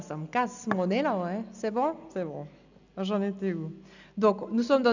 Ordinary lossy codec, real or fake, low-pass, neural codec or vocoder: none; real; 7.2 kHz; none